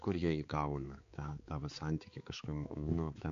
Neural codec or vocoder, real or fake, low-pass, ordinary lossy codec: codec, 16 kHz, 4 kbps, X-Codec, HuBERT features, trained on balanced general audio; fake; 7.2 kHz; MP3, 48 kbps